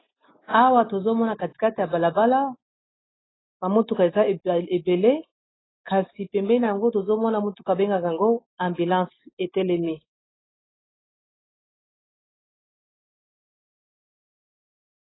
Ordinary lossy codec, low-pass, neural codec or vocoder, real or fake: AAC, 16 kbps; 7.2 kHz; none; real